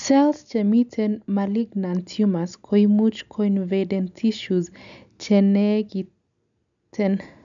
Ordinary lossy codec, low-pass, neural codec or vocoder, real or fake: none; 7.2 kHz; none; real